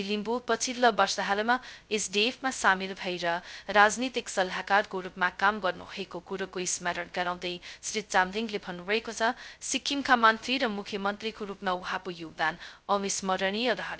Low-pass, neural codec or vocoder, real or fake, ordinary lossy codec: none; codec, 16 kHz, 0.2 kbps, FocalCodec; fake; none